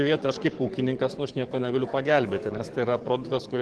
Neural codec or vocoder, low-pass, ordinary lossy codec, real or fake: codec, 44.1 kHz, 7.8 kbps, DAC; 10.8 kHz; Opus, 16 kbps; fake